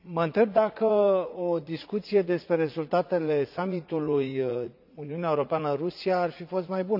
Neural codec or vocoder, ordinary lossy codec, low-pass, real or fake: vocoder, 44.1 kHz, 80 mel bands, Vocos; none; 5.4 kHz; fake